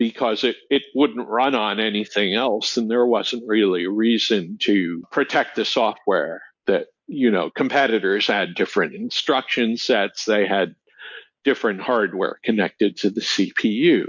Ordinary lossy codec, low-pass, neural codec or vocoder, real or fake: MP3, 48 kbps; 7.2 kHz; none; real